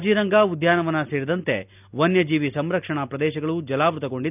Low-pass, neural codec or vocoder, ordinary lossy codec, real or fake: 3.6 kHz; none; none; real